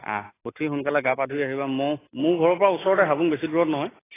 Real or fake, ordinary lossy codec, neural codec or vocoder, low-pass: real; AAC, 16 kbps; none; 3.6 kHz